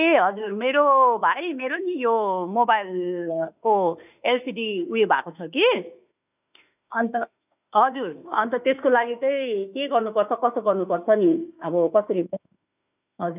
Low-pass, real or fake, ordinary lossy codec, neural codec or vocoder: 3.6 kHz; fake; none; autoencoder, 48 kHz, 32 numbers a frame, DAC-VAE, trained on Japanese speech